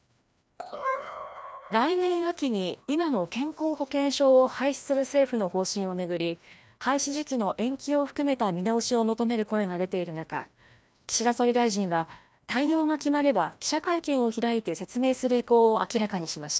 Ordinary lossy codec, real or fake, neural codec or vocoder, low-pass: none; fake; codec, 16 kHz, 1 kbps, FreqCodec, larger model; none